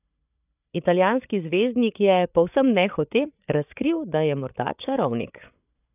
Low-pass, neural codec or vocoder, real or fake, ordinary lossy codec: 3.6 kHz; codec, 16 kHz, 8 kbps, FreqCodec, larger model; fake; none